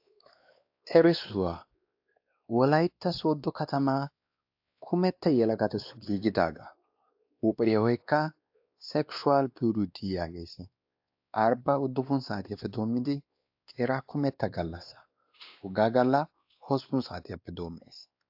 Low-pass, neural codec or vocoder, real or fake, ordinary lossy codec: 5.4 kHz; codec, 16 kHz, 2 kbps, X-Codec, WavLM features, trained on Multilingual LibriSpeech; fake; AAC, 48 kbps